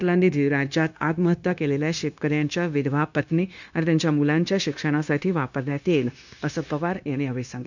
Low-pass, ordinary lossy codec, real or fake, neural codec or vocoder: 7.2 kHz; none; fake; codec, 16 kHz, 0.9 kbps, LongCat-Audio-Codec